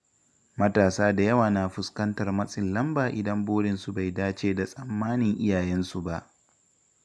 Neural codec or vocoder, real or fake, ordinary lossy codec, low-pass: none; real; none; none